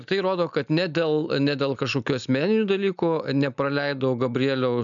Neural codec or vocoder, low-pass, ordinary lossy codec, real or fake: none; 7.2 kHz; MP3, 96 kbps; real